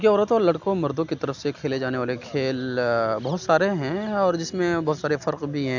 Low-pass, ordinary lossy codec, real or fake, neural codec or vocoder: 7.2 kHz; none; real; none